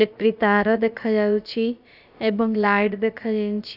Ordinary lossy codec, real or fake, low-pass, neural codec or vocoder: none; fake; 5.4 kHz; codec, 16 kHz, about 1 kbps, DyCAST, with the encoder's durations